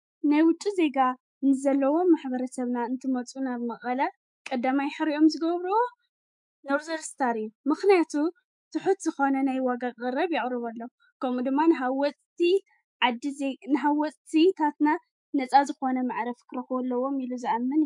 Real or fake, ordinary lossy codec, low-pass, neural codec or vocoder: fake; MP3, 64 kbps; 10.8 kHz; autoencoder, 48 kHz, 128 numbers a frame, DAC-VAE, trained on Japanese speech